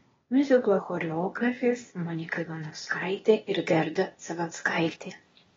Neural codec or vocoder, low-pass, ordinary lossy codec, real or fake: codec, 16 kHz, 0.8 kbps, ZipCodec; 7.2 kHz; AAC, 24 kbps; fake